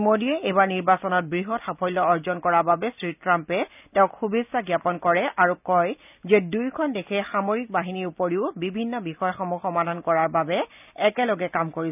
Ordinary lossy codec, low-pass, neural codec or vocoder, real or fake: none; 3.6 kHz; none; real